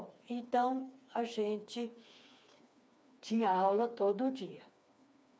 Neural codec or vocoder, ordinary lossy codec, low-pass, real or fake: codec, 16 kHz, 4 kbps, FreqCodec, smaller model; none; none; fake